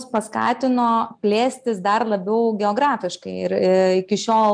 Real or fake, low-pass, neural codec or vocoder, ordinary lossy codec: real; 9.9 kHz; none; MP3, 96 kbps